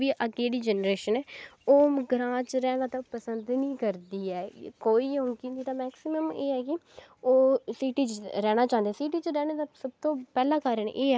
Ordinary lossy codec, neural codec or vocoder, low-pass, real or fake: none; none; none; real